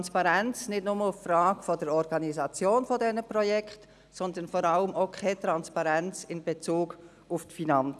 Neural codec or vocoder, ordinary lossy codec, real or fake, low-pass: none; none; real; none